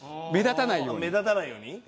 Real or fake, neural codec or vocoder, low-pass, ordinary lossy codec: real; none; none; none